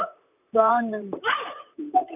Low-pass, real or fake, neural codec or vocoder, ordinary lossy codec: 3.6 kHz; fake; vocoder, 44.1 kHz, 128 mel bands, Pupu-Vocoder; none